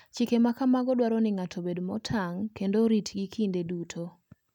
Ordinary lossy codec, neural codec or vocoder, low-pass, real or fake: none; none; 19.8 kHz; real